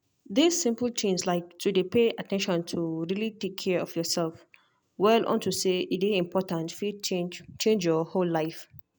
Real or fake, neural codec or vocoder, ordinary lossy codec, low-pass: real; none; none; none